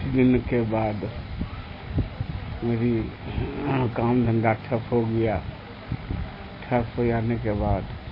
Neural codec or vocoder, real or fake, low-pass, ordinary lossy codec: none; real; 5.4 kHz; MP3, 24 kbps